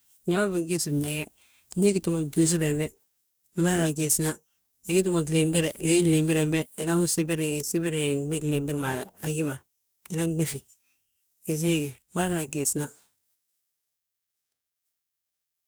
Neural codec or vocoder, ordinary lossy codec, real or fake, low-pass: codec, 44.1 kHz, 2.6 kbps, DAC; none; fake; none